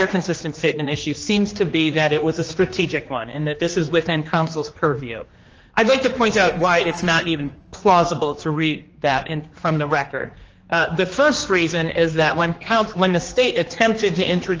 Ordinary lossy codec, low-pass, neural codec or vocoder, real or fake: Opus, 24 kbps; 7.2 kHz; codec, 16 kHz, 2 kbps, X-Codec, HuBERT features, trained on general audio; fake